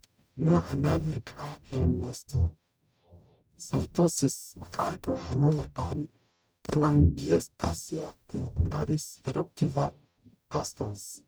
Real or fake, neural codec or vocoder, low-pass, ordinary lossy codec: fake; codec, 44.1 kHz, 0.9 kbps, DAC; none; none